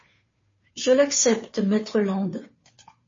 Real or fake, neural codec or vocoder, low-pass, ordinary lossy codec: fake; codec, 16 kHz, 2 kbps, FunCodec, trained on Chinese and English, 25 frames a second; 7.2 kHz; MP3, 32 kbps